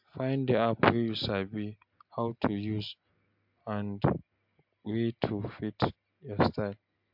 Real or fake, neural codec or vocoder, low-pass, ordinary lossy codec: real; none; 5.4 kHz; MP3, 48 kbps